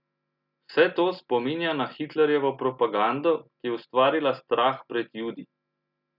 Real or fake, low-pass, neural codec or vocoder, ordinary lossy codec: real; 5.4 kHz; none; none